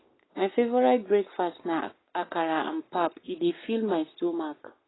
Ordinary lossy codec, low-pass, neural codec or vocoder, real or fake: AAC, 16 kbps; 7.2 kHz; codec, 44.1 kHz, 7.8 kbps, Pupu-Codec; fake